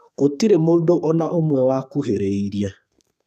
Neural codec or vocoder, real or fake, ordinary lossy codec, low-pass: codec, 32 kHz, 1.9 kbps, SNAC; fake; none; 14.4 kHz